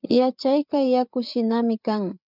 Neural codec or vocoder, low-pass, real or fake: codec, 16 kHz, 8 kbps, FreqCodec, larger model; 5.4 kHz; fake